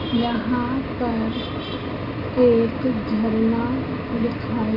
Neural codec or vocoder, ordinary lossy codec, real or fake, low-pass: none; none; real; 5.4 kHz